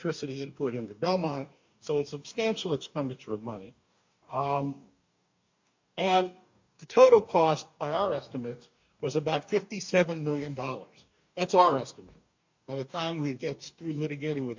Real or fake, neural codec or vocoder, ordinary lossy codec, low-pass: fake; codec, 44.1 kHz, 2.6 kbps, DAC; MP3, 48 kbps; 7.2 kHz